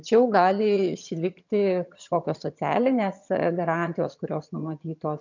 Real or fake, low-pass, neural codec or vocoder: fake; 7.2 kHz; vocoder, 22.05 kHz, 80 mel bands, HiFi-GAN